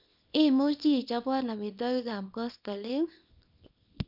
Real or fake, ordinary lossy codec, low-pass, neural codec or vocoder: fake; none; 5.4 kHz; codec, 24 kHz, 0.9 kbps, WavTokenizer, small release